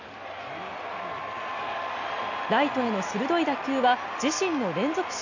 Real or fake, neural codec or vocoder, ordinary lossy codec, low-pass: real; none; none; 7.2 kHz